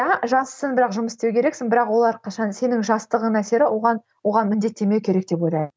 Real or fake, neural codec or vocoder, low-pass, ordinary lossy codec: real; none; none; none